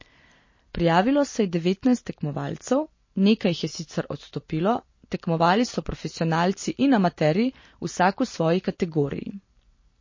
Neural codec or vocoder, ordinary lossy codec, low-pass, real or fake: none; MP3, 32 kbps; 7.2 kHz; real